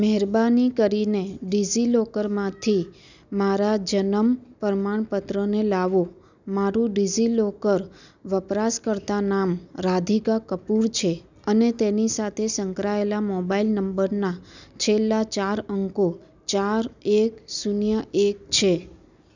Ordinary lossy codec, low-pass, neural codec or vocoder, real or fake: none; 7.2 kHz; none; real